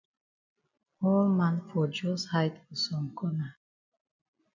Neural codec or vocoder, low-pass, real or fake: none; 7.2 kHz; real